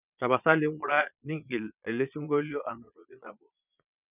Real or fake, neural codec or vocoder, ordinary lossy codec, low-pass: fake; vocoder, 22.05 kHz, 80 mel bands, Vocos; none; 3.6 kHz